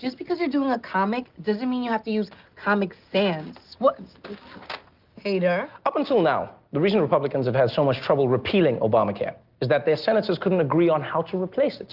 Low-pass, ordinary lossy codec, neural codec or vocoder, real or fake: 5.4 kHz; Opus, 32 kbps; none; real